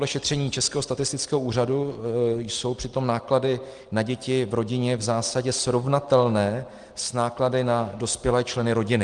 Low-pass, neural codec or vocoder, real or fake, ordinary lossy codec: 9.9 kHz; none; real; Opus, 24 kbps